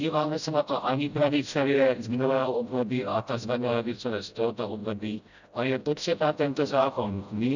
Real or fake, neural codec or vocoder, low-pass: fake; codec, 16 kHz, 0.5 kbps, FreqCodec, smaller model; 7.2 kHz